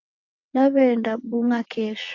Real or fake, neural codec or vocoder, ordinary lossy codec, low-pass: fake; vocoder, 22.05 kHz, 80 mel bands, WaveNeXt; AAC, 48 kbps; 7.2 kHz